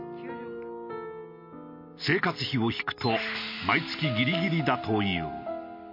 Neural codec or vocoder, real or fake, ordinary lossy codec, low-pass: none; real; MP3, 32 kbps; 5.4 kHz